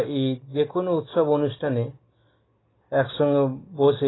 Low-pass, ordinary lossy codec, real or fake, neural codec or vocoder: 7.2 kHz; AAC, 16 kbps; real; none